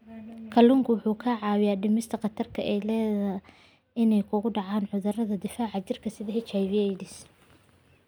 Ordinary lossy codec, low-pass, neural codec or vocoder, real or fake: none; none; none; real